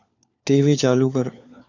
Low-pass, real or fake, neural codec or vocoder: 7.2 kHz; fake; codec, 16 kHz, 4 kbps, FunCodec, trained on LibriTTS, 50 frames a second